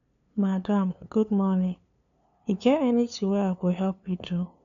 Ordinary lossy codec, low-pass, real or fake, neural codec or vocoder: none; 7.2 kHz; fake; codec, 16 kHz, 2 kbps, FunCodec, trained on LibriTTS, 25 frames a second